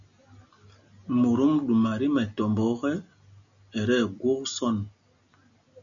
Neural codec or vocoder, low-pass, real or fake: none; 7.2 kHz; real